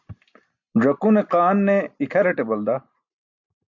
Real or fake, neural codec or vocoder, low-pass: real; none; 7.2 kHz